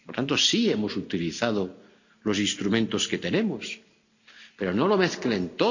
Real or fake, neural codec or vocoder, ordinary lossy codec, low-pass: real; none; none; 7.2 kHz